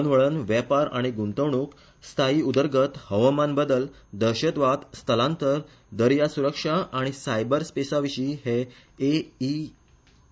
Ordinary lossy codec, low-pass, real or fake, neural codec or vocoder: none; none; real; none